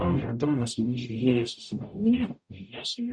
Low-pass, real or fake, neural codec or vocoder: 9.9 kHz; fake; codec, 44.1 kHz, 0.9 kbps, DAC